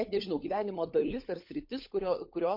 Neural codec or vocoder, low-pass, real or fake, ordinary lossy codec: codec, 16 kHz, 16 kbps, FunCodec, trained on LibriTTS, 50 frames a second; 5.4 kHz; fake; MP3, 32 kbps